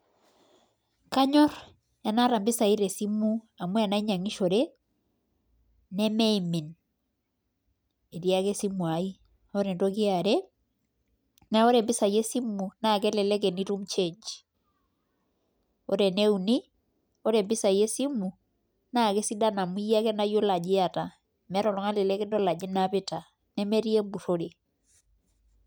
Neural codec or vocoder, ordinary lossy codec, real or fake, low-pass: none; none; real; none